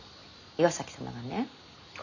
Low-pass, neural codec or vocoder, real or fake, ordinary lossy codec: 7.2 kHz; none; real; none